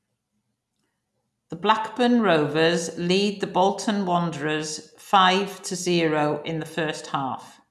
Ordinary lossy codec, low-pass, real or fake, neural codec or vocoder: none; none; real; none